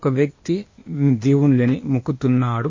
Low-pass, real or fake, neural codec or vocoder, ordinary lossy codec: 7.2 kHz; fake; codec, 16 kHz, 0.8 kbps, ZipCodec; MP3, 32 kbps